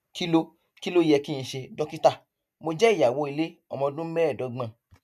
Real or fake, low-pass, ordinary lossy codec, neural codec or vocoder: real; 14.4 kHz; none; none